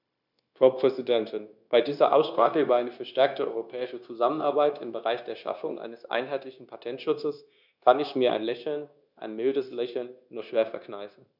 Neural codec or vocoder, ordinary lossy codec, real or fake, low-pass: codec, 16 kHz, 0.9 kbps, LongCat-Audio-Codec; none; fake; 5.4 kHz